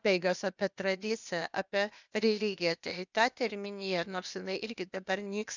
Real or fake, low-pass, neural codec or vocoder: fake; 7.2 kHz; codec, 16 kHz, 0.8 kbps, ZipCodec